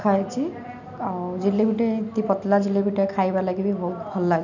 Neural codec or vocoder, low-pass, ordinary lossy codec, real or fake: none; 7.2 kHz; none; real